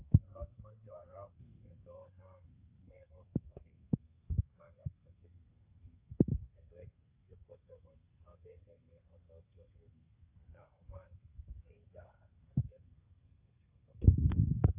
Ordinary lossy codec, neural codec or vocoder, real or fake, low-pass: AAC, 24 kbps; codec, 32 kHz, 1.9 kbps, SNAC; fake; 3.6 kHz